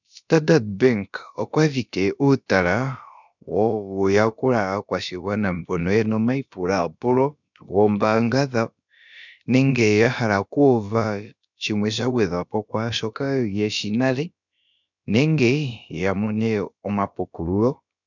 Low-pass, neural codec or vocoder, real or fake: 7.2 kHz; codec, 16 kHz, about 1 kbps, DyCAST, with the encoder's durations; fake